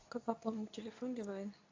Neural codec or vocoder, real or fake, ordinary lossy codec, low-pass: codec, 24 kHz, 0.9 kbps, WavTokenizer, medium speech release version 2; fake; AAC, 32 kbps; 7.2 kHz